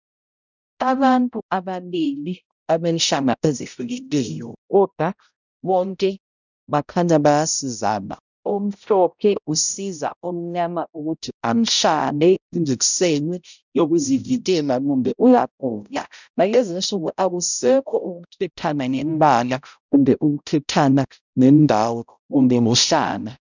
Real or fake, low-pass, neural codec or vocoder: fake; 7.2 kHz; codec, 16 kHz, 0.5 kbps, X-Codec, HuBERT features, trained on balanced general audio